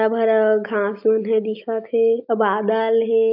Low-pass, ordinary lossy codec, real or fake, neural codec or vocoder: 5.4 kHz; AAC, 48 kbps; real; none